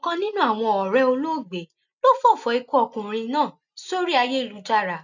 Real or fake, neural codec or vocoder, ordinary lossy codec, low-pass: real; none; none; 7.2 kHz